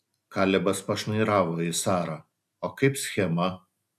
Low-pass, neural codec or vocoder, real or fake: 14.4 kHz; none; real